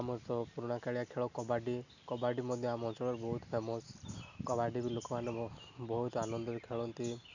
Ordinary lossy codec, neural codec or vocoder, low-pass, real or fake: none; none; 7.2 kHz; real